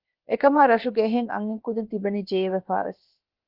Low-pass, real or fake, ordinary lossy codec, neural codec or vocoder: 5.4 kHz; fake; Opus, 32 kbps; codec, 16 kHz, about 1 kbps, DyCAST, with the encoder's durations